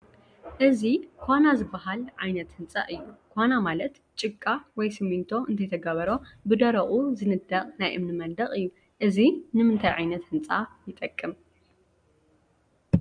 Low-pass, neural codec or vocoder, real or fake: 9.9 kHz; none; real